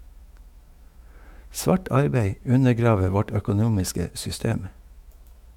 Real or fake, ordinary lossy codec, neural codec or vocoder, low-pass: fake; MP3, 96 kbps; autoencoder, 48 kHz, 128 numbers a frame, DAC-VAE, trained on Japanese speech; 19.8 kHz